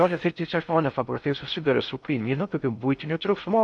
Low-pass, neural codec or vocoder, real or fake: 10.8 kHz; codec, 16 kHz in and 24 kHz out, 0.6 kbps, FocalCodec, streaming, 4096 codes; fake